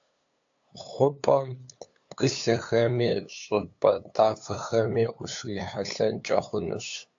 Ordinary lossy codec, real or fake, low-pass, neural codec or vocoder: AAC, 64 kbps; fake; 7.2 kHz; codec, 16 kHz, 2 kbps, FunCodec, trained on LibriTTS, 25 frames a second